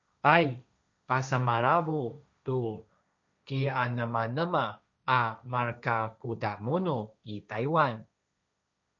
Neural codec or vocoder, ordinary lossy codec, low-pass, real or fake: codec, 16 kHz, 1.1 kbps, Voila-Tokenizer; MP3, 96 kbps; 7.2 kHz; fake